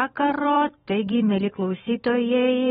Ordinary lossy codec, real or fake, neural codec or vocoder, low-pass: AAC, 16 kbps; fake; vocoder, 44.1 kHz, 128 mel bands every 512 samples, BigVGAN v2; 19.8 kHz